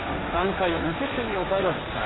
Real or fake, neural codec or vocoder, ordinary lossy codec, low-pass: fake; codec, 16 kHz, 1.1 kbps, Voila-Tokenizer; AAC, 16 kbps; 7.2 kHz